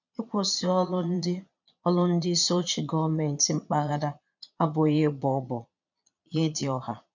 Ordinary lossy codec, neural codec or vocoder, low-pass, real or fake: none; vocoder, 22.05 kHz, 80 mel bands, Vocos; 7.2 kHz; fake